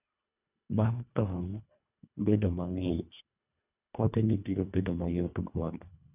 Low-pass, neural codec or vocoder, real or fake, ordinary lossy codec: 3.6 kHz; codec, 24 kHz, 1.5 kbps, HILCodec; fake; none